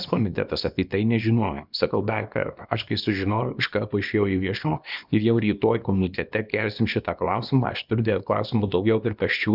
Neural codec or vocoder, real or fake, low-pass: codec, 24 kHz, 0.9 kbps, WavTokenizer, small release; fake; 5.4 kHz